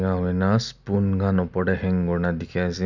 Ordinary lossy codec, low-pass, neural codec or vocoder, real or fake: none; 7.2 kHz; none; real